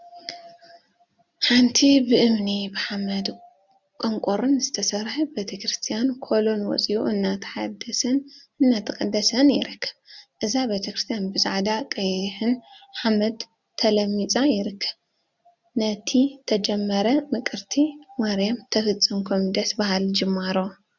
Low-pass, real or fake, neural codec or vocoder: 7.2 kHz; real; none